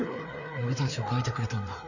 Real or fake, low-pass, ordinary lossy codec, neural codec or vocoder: fake; 7.2 kHz; none; codec, 16 kHz, 4 kbps, FreqCodec, larger model